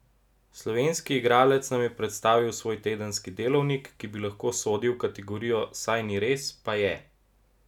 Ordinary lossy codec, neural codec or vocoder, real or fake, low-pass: none; none; real; 19.8 kHz